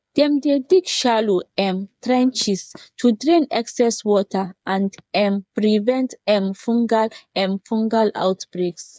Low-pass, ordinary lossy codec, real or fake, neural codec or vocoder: none; none; fake; codec, 16 kHz, 8 kbps, FreqCodec, smaller model